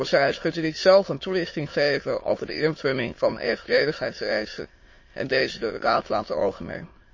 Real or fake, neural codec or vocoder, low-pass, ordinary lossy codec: fake; autoencoder, 22.05 kHz, a latent of 192 numbers a frame, VITS, trained on many speakers; 7.2 kHz; MP3, 32 kbps